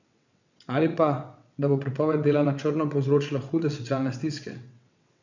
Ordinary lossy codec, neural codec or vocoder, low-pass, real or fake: none; vocoder, 22.05 kHz, 80 mel bands, WaveNeXt; 7.2 kHz; fake